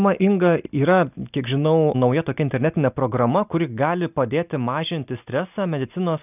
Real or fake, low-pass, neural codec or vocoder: real; 3.6 kHz; none